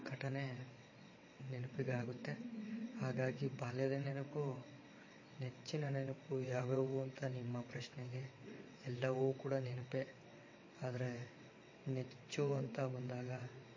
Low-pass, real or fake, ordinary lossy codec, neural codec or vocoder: 7.2 kHz; fake; MP3, 32 kbps; vocoder, 22.05 kHz, 80 mel bands, WaveNeXt